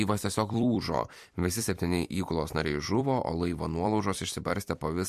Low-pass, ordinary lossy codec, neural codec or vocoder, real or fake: 14.4 kHz; MP3, 64 kbps; vocoder, 44.1 kHz, 128 mel bands every 256 samples, BigVGAN v2; fake